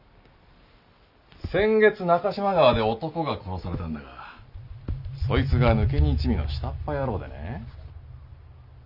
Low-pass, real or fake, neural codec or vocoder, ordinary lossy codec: 5.4 kHz; real; none; none